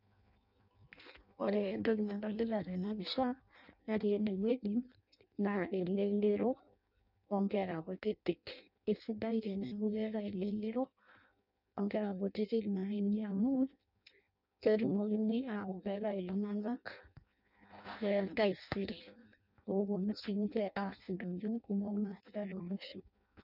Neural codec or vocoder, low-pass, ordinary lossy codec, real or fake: codec, 16 kHz in and 24 kHz out, 0.6 kbps, FireRedTTS-2 codec; 5.4 kHz; none; fake